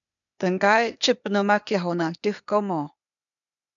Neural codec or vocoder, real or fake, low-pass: codec, 16 kHz, 0.8 kbps, ZipCodec; fake; 7.2 kHz